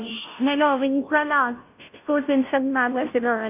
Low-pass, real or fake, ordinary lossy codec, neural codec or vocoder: 3.6 kHz; fake; none; codec, 16 kHz, 0.5 kbps, FunCodec, trained on Chinese and English, 25 frames a second